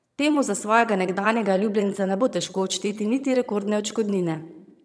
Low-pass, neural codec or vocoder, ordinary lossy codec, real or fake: none; vocoder, 22.05 kHz, 80 mel bands, HiFi-GAN; none; fake